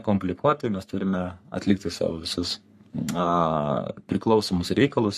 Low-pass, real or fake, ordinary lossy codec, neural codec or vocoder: 14.4 kHz; fake; MP3, 64 kbps; codec, 44.1 kHz, 3.4 kbps, Pupu-Codec